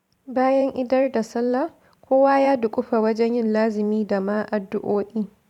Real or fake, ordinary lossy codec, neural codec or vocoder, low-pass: fake; none; vocoder, 44.1 kHz, 128 mel bands every 512 samples, BigVGAN v2; 19.8 kHz